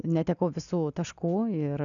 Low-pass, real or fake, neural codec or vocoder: 7.2 kHz; real; none